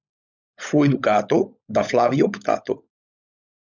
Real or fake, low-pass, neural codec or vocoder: fake; 7.2 kHz; codec, 16 kHz, 16 kbps, FunCodec, trained on LibriTTS, 50 frames a second